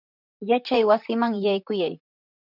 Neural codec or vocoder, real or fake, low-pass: vocoder, 44.1 kHz, 128 mel bands, Pupu-Vocoder; fake; 5.4 kHz